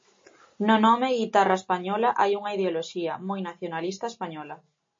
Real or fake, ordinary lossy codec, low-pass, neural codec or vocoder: real; MP3, 32 kbps; 7.2 kHz; none